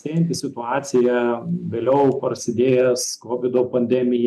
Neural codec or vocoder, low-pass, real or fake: none; 14.4 kHz; real